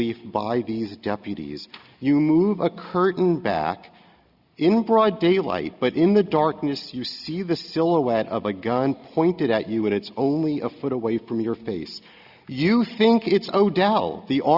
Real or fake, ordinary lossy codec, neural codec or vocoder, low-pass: real; Opus, 64 kbps; none; 5.4 kHz